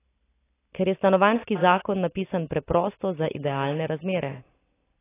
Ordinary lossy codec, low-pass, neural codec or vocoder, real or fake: AAC, 16 kbps; 3.6 kHz; none; real